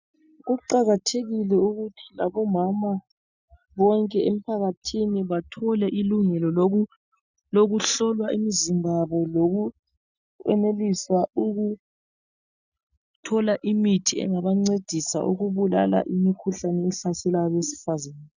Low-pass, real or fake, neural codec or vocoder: 7.2 kHz; real; none